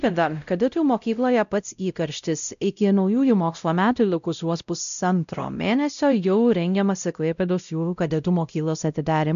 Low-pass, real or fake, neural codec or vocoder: 7.2 kHz; fake; codec, 16 kHz, 0.5 kbps, X-Codec, WavLM features, trained on Multilingual LibriSpeech